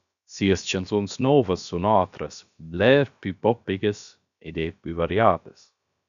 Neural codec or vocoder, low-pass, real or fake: codec, 16 kHz, about 1 kbps, DyCAST, with the encoder's durations; 7.2 kHz; fake